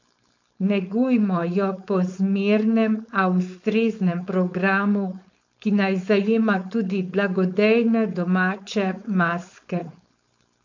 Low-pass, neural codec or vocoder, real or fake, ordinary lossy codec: 7.2 kHz; codec, 16 kHz, 4.8 kbps, FACodec; fake; MP3, 64 kbps